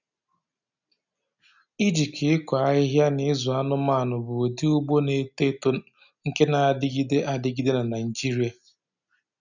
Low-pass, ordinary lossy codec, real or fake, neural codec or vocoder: 7.2 kHz; none; real; none